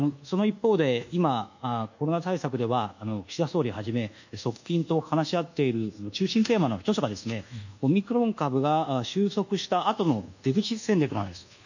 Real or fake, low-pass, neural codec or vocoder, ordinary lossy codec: fake; 7.2 kHz; codec, 24 kHz, 1.2 kbps, DualCodec; none